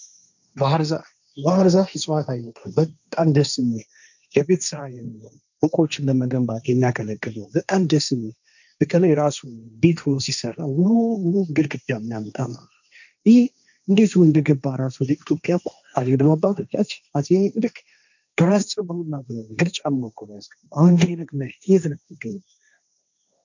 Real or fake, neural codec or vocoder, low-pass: fake; codec, 16 kHz, 1.1 kbps, Voila-Tokenizer; 7.2 kHz